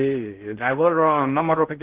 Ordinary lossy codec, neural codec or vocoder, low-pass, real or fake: Opus, 16 kbps; codec, 16 kHz in and 24 kHz out, 0.6 kbps, FocalCodec, streaming, 2048 codes; 3.6 kHz; fake